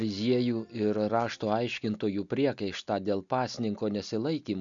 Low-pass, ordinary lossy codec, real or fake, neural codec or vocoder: 7.2 kHz; MP3, 64 kbps; real; none